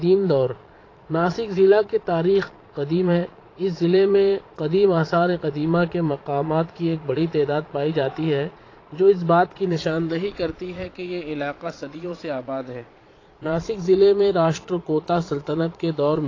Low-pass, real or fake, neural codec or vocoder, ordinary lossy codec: 7.2 kHz; fake; vocoder, 22.05 kHz, 80 mel bands, Vocos; AAC, 32 kbps